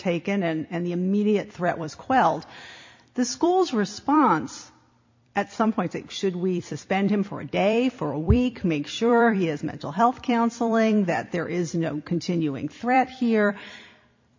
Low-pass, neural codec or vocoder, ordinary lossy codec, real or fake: 7.2 kHz; vocoder, 44.1 kHz, 128 mel bands every 256 samples, BigVGAN v2; MP3, 48 kbps; fake